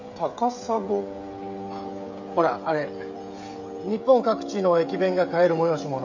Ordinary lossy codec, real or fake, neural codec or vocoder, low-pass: none; fake; codec, 16 kHz, 16 kbps, FreqCodec, smaller model; 7.2 kHz